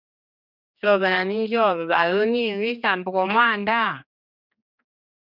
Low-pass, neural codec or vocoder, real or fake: 5.4 kHz; codec, 16 kHz, 2 kbps, X-Codec, HuBERT features, trained on general audio; fake